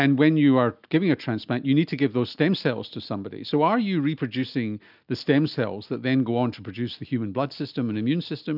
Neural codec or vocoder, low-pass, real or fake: none; 5.4 kHz; real